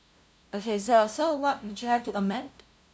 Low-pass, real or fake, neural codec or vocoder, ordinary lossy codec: none; fake; codec, 16 kHz, 0.5 kbps, FunCodec, trained on LibriTTS, 25 frames a second; none